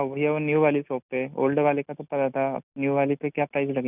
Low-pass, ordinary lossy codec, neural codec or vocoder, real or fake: 3.6 kHz; none; none; real